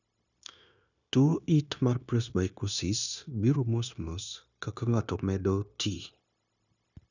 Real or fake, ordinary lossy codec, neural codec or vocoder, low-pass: fake; none; codec, 16 kHz, 0.9 kbps, LongCat-Audio-Codec; 7.2 kHz